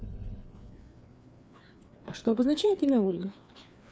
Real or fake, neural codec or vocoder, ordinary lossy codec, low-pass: fake; codec, 16 kHz, 4 kbps, FreqCodec, larger model; none; none